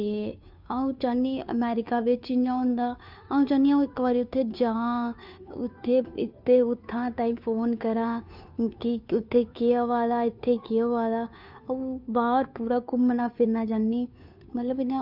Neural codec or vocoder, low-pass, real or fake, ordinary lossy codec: codec, 16 kHz, 2 kbps, FunCodec, trained on Chinese and English, 25 frames a second; 5.4 kHz; fake; none